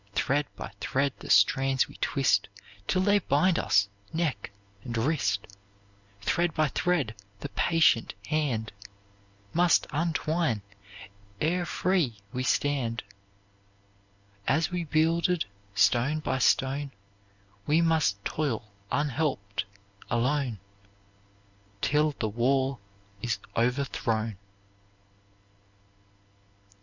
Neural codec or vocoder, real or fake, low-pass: none; real; 7.2 kHz